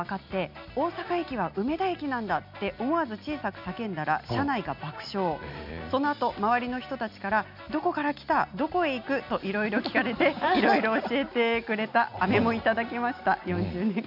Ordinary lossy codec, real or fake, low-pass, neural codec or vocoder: none; real; 5.4 kHz; none